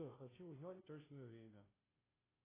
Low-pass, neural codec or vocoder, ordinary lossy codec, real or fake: 3.6 kHz; codec, 16 kHz, 0.5 kbps, FunCodec, trained on Chinese and English, 25 frames a second; AAC, 16 kbps; fake